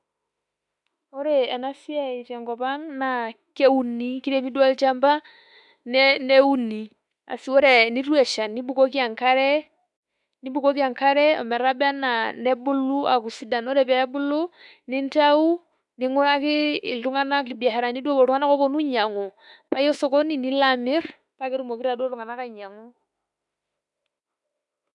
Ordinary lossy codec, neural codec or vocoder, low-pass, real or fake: none; autoencoder, 48 kHz, 32 numbers a frame, DAC-VAE, trained on Japanese speech; 10.8 kHz; fake